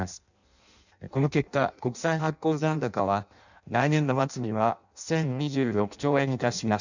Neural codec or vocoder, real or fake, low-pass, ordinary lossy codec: codec, 16 kHz in and 24 kHz out, 0.6 kbps, FireRedTTS-2 codec; fake; 7.2 kHz; none